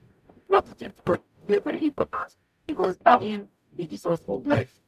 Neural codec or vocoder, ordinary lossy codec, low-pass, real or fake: codec, 44.1 kHz, 0.9 kbps, DAC; none; 14.4 kHz; fake